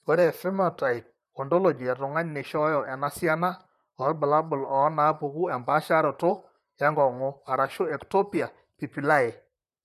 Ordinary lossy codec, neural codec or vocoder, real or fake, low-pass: none; vocoder, 44.1 kHz, 128 mel bands, Pupu-Vocoder; fake; 14.4 kHz